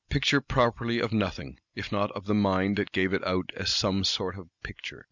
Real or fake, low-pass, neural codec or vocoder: real; 7.2 kHz; none